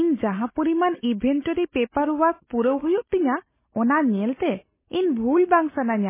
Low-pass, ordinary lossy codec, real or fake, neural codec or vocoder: 3.6 kHz; MP3, 16 kbps; real; none